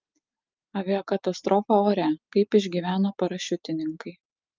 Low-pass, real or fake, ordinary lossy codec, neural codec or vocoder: 7.2 kHz; real; Opus, 24 kbps; none